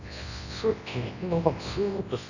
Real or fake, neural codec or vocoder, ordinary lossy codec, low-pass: fake; codec, 24 kHz, 0.9 kbps, WavTokenizer, large speech release; none; 7.2 kHz